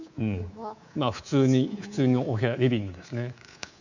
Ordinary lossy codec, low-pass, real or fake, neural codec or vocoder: none; 7.2 kHz; fake; codec, 24 kHz, 3.1 kbps, DualCodec